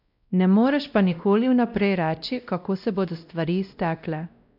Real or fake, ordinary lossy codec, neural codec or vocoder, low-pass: fake; none; codec, 16 kHz, 1 kbps, X-Codec, WavLM features, trained on Multilingual LibriSpeech; 5.4 kHz